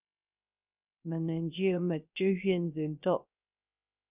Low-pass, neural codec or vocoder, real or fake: 3.6 kHz; codec, 16 kHz, 0.3 kbps, FocalCodec; fake